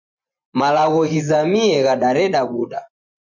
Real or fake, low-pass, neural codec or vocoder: fake; 7.2 kHz; vocoder, 24 kHz, 100 mel bands, Vocos